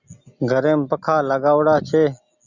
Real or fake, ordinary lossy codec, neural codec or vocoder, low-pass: real; Opus, 64 kbps; none; 7.2 kHz